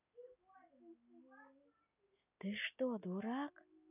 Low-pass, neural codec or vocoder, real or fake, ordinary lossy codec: 3.6 kHz; none; real; none